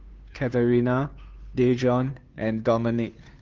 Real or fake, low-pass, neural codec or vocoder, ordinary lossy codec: fake; 7.2 kHz; codec, 16 kHz, 2 kbps, FunCodec, trained on Chinese and English, 25 frames a second; Opus, 32 kbps